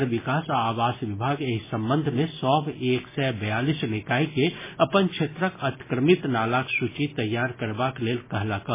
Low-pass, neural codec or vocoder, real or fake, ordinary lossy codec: 3.6 kHz; none; real; MP3, 16 kbps